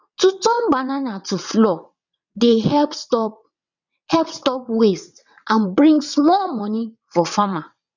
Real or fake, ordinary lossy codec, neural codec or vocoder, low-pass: fake; none; vocoder, 22.05 kHz, 80 mel bands, WaveNeXt; 7.2 kHz